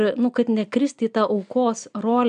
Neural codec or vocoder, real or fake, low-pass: none; real; 9.9 kHz